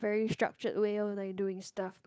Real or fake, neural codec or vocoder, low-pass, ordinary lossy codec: fake; codec, 16 kHz, 8 kbps, FunCodec, trained on Chinese and English, 25 frames a second; none; none